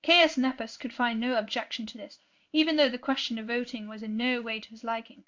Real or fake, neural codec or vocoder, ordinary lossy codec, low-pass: fake; codec, 16 kHz in and 24 kHz out, 1 kbps, XY-Tokenizer; MP3, 64 kbps; 7.2 kHz